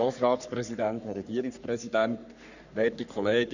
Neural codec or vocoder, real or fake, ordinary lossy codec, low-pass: codec, 44.1 kHz, 3.4 kbps, Pupu-Codec; fake; none; 7.2 kHz